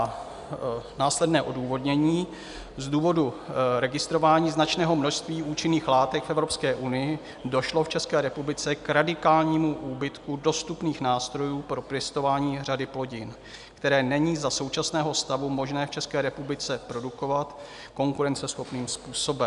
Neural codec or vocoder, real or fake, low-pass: none; real; 10.8 kHz